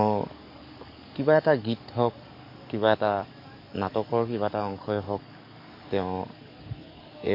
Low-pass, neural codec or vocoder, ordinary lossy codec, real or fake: 5.4 kHz; codec, 44.1 kHz, 7.8 kbps, DAC; MP3, 32 kbps; fake